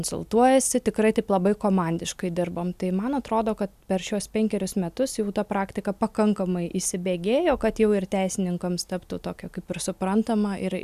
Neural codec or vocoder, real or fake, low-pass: none; real; 14.4 kHz